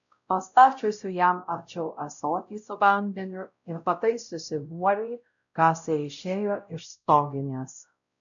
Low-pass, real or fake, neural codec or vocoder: 7.2 kHz; fake; codec, 16 kHz, 0.5 kbps, X-Codec, WavLM features, trained on Multilingual LibriSpeech